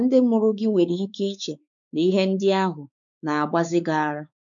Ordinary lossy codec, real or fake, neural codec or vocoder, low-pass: none; fake; codec, 16 kHz, 4 kbps, X-Codec, WavLM features, trained on Multilingual LibriSpeech; 7.2 kHz